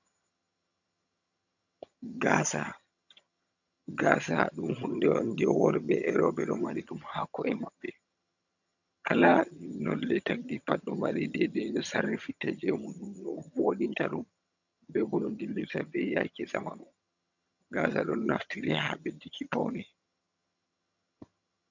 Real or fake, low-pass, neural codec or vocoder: fake; 7.2 kHz; vocoder, 22.05 kHz, 80 mel bands, HiFi-GAN